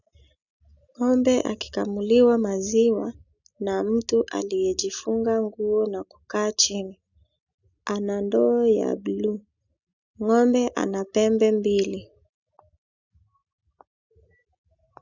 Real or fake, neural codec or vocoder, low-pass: real; none; 7.2 kHz